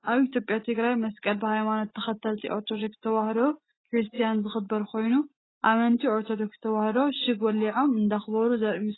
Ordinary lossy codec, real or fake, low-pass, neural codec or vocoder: AAC, 16 kbps; real; 7.2 kHz; none